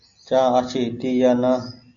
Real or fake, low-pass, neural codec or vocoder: real; 7.2 kHz; none